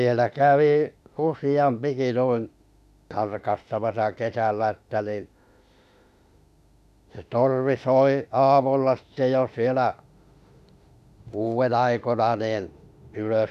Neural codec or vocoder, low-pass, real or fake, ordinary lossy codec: autoencoder, 48 kHz, 32 numbers a frame, DAC-VAE, trained on Japanese speech; 14.4 kHz; fake; none